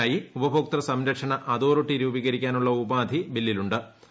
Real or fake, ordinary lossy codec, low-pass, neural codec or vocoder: real; none; none; none